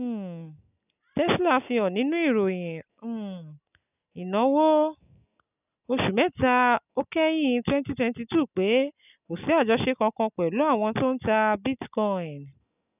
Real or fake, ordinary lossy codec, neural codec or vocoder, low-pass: real; none; none; 3.6 kHz